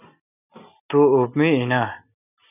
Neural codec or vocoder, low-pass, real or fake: none; 3.6 kHz; real